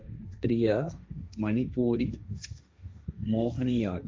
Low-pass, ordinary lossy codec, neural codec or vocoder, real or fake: none; none; codec, 16 kHz, 1.1 kbps, Voila-Tokenizer; fake